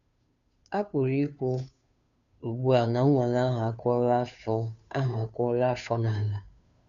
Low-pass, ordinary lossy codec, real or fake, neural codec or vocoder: 7.2 kHz; none; fake; codec, 16 kHz, 2 kbps, FunCodec, trained on Chinese and English, 25 frames a second